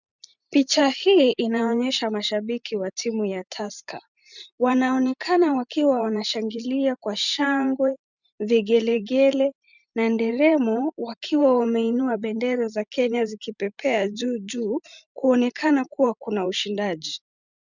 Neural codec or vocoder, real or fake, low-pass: vocoder, 44.1 kHz, 128 mel bands every 512 samples, BigVGAN v2; fake; 7.2 kHz